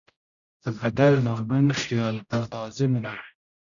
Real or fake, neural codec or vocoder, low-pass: fake; codec, 16 kHz, 0.5 kbps, X-Codec, HuBERT features, trained on general audio; 7.2 kHz